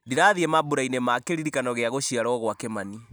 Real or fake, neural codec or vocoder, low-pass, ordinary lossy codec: real; none; none; none